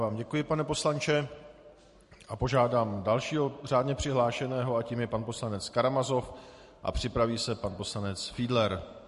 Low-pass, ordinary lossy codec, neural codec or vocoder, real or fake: 14.4 kHz; MP3, 48 kbps; vocoder, 44.1 kHz, 128 mel bands every 512 samples, BigVGAN v2; fake